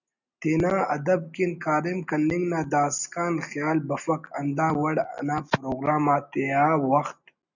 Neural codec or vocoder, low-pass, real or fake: none; 7.2 kHz; real